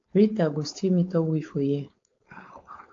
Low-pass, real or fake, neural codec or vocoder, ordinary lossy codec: 7.2 kHz; fake; codec, 16 kHz, 4.8 kbps, FACodec; AAC, 48 kbps